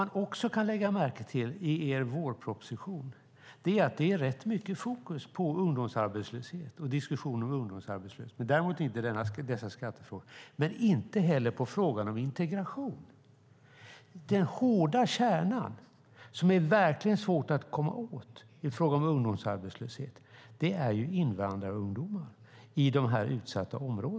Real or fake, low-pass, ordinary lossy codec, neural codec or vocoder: real; none; none; none